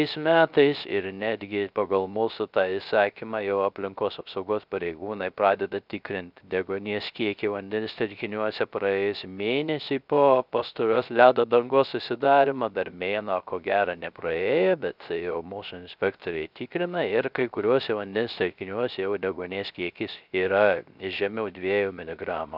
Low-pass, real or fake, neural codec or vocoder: 5.4 kHz; fake; codec, 16 kHz, 0.3 kbps, FocalCodec